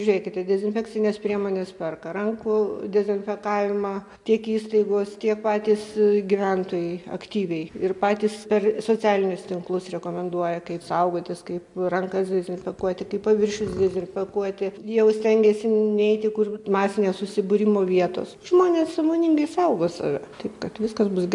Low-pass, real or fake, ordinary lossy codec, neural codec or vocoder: 10.8 kHz; real; MP3, 64 kbps; none